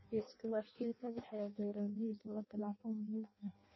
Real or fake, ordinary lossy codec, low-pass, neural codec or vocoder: fake; MP3, 24 kbps; 7.2 kHz; codec, 16 kHz in and 24 kHz out, 0.6 kbps, FireRedTTS-2 codec